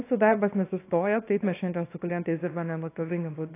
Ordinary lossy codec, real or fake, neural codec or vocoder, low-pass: AAC, 24 kbps; fake; codec, 24 kHz, 0.9 kbps, WavTokenizer, small release; 3.6 kHz